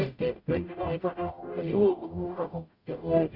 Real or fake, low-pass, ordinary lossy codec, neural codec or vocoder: fake; 5.4 kHz; Opus, 64 kbps; codec, 44.1 kHz, 0.9 kbps, DAC